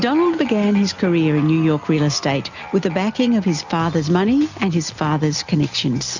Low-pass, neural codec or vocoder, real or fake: 7.2 kHz; none; real